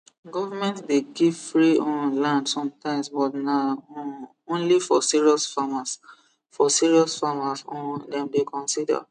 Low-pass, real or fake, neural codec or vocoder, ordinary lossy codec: 9.9 kHz; real; none; none